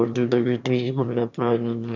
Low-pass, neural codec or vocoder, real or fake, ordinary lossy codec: 7.2 kHz; autoencoder, 22.05 kHz, a latent of 192 numbers a frame, VITS, trained on one speaker; fake; none